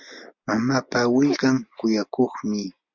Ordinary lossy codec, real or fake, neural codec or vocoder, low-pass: MP3, 64 kbps; real; none; 7.2 kHz